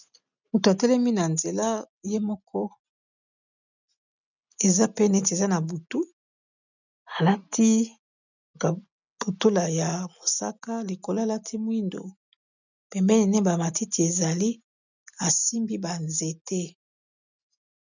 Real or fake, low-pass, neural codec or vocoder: real; 7.2 kHz; none